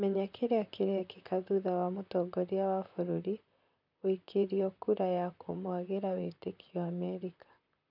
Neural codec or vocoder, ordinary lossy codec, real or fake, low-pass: vocoder, 44.1 kHz, 128 mel bands, Pupu-Vocoder; none; fake; 5.4 kHz